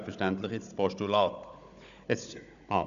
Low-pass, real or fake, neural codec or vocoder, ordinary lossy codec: 7.2 kHz; fake; codec, 16 kHz, 16 kbps, FunCodec, trained on Chinese and English, 50 frames a second; none